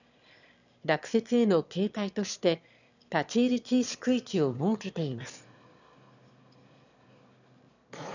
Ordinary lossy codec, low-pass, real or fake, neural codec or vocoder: none; 7.2 kHz; fake; autoencoder, 22.05 kHz, a latent of 192 numbers a frame, VITS, trained on one speaker